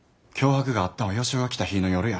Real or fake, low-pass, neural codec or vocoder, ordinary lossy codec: real; none; none; none